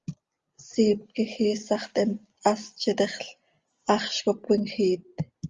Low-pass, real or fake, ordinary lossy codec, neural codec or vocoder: 7.2 kHz; real; Opus, 32 kbps; none